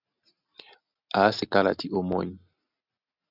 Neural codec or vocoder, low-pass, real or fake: none; 5.4 kHz; real